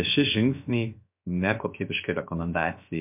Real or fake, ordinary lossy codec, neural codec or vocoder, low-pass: fake; MP3, 32 kbps; codec, 16 kHz, 0.7 kbps, FocalCodec; 3.6 kHz